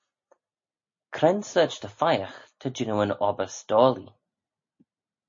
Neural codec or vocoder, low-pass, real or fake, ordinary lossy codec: none; 7.2 kHz; real; MP3, 32 kbps